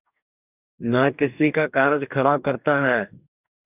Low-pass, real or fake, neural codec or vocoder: 3.6 kHz; fake; codec, 44.1 kHz, 2.6 kbps, DAC